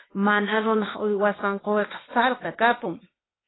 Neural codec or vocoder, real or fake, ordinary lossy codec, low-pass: codec, 16 kHz, 0.8 kbps, ZipCodec; fake; AAC, 16 kbps; 7.2 kHz